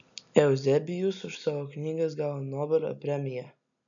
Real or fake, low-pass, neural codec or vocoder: real; 7.2 kHz; none